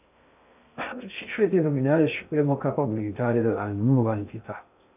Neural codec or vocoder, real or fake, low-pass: codec, 16 kHz in and 24 kHz out, 0.6 kbps, FocalCodec, streaming, 2048 codes; fake; 3.6 kHz